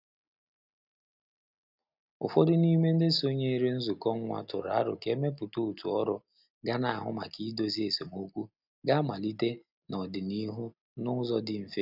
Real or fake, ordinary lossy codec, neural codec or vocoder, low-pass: real; none; none; 5.4 kHz